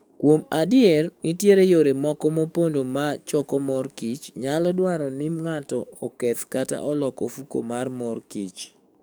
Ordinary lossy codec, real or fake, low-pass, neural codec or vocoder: none; fake; none; codec, 44.1 kHz, 7.8 kbps, DAC